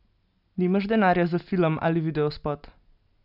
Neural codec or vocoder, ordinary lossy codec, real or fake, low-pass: none; none; real; 5.4 kHz